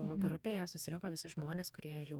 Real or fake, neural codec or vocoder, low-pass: fake; codec, 44.1 kHz, 2.6 kbps, DAC; 19.8 kHz